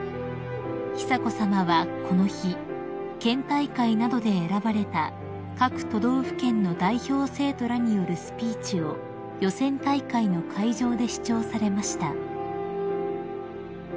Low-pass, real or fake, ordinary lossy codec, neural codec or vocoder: none; real; none; none